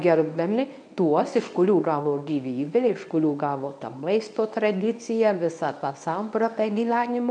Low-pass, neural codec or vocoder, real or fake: 9.9 kHz; codec, 24 kHz, 0.9 kbps, WavTokenizer, medium speech release version 1; fake